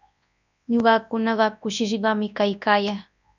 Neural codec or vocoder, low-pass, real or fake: codec, 24 kHz, 0.9 kbps, WavTokenizer, large speech release; 7.2 kHz; fake